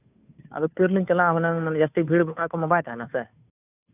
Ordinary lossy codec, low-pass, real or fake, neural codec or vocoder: none; 3.6 kHz; fake; codec, 16 kHz, 2 kbps, FunCodec, trained on Chinese and English, 25 frames a second